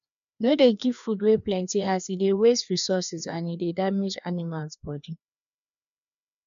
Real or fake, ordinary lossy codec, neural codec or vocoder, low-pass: fake; none; codec, 16 kHz, 2 kbps, FreqCodec, larger model; 7.2 kHz